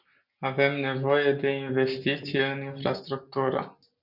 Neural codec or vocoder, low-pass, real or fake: none; 5.4 kHz; real